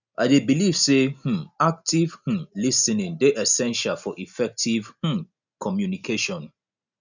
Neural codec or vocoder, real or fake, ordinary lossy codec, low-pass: none; real; none; 7.2 kHz